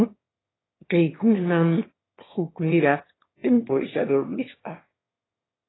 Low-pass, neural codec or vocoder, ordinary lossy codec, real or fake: 7.2 kHz; autoencoder, 22.05 kHz, a latent of 192 numbers a frame, VITS, trained on one speaker; AAC, 16 kbps; fake